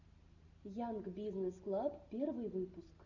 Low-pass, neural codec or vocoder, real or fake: 7.2 kHz; none; real